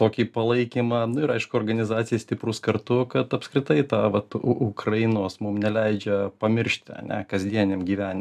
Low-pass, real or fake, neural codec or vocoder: 14.4 kHz; real; none